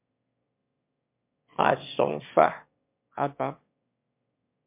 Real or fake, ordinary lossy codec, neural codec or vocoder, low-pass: fake; MP3, 32 kbps; autoencoder, 22.05 kHz, a latent of 192 numbers a frame, VITS, trained on one speaker; 3.6 kHz